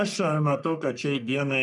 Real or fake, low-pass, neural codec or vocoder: fake; 10.8 kHz; codec, 44.1 kHz, 3.4 kbps, Pupu-Codec